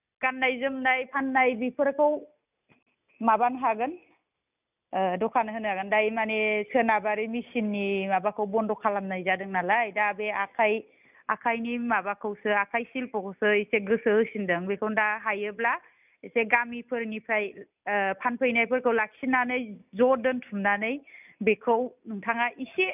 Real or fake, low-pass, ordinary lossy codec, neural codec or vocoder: real; 3.6 kHz; none; none